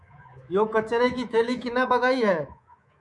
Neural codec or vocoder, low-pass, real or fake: codec, 24 kHz, 3.1 kbps, DualCodec; 10.8 kHz; fake